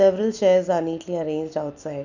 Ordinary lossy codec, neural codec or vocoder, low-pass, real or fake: none; none; 7.2 kHz; real